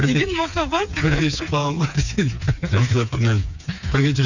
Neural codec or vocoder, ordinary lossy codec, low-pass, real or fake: codec, 16 kHz, 4 kbps, FreqCodec, smaller model; none; 7.2 kHz; fake